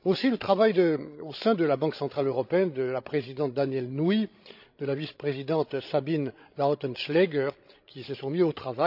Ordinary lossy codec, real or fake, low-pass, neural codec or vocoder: none; fake; 5.4 kHz; codec, 16 kHz, 16 kbps, FreqCodec, larger model